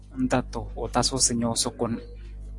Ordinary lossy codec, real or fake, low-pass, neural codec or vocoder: AAC, 48 kbps; real; 10.8 kHz; none